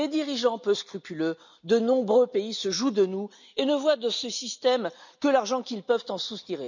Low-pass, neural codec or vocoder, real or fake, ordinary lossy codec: 7.2 kHz; none; real; none